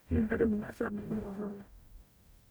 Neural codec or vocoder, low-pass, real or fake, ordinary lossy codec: codec, 44.1 kHz, 0.9 kbps, DAC; none; fake; none